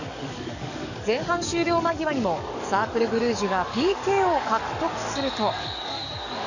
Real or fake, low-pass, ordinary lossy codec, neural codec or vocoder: fake; 7.2 kHz; none; codec, 44.1 kHz, 7.8 kbps, DAC